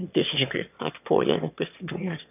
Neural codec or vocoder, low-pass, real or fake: autoencoder, 22.05 kHz, a latent of 192 numbers a frame, VITS, trained on one speaker; 3.6 kHz; fake